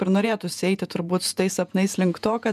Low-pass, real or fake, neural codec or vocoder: 14.4 kHz; fake; vocoder, 48 kHz, 128 mel bands, Vocos